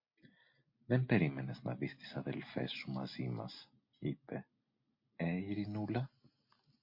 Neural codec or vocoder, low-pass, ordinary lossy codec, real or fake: none; 5.4 kHz; MP3, 32 kbps; real